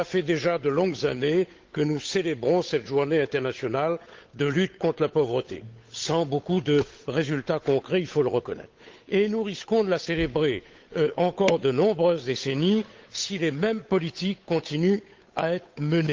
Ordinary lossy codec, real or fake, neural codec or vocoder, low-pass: Opus, 32 kbps; fake; codec, 16 kHz, 8 kbps, FunCodec, trained on Chinese and English, 25 frames a second; 7.2 kHz